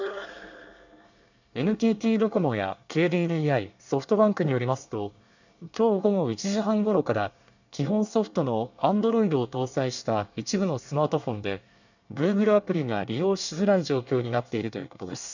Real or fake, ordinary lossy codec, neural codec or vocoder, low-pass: fake; none; codec, 24 kHz, 1 kbps, SNAC; 7.2 kHz